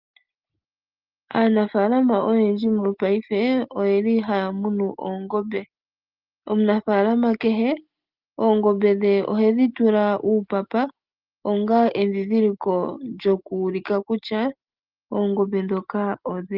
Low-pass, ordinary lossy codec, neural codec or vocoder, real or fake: 5.4 kHz; Opus, 32 kbps; none; real